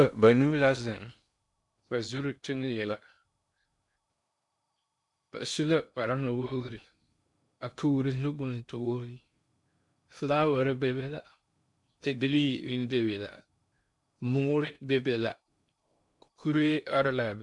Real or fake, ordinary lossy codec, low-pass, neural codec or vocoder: fake; MP3, 64 kbps; 10.8 kHz; codec, 16 kHz in and 24 kHz out, 0.6 kbps, FocalCodec, streaming, 2048 codes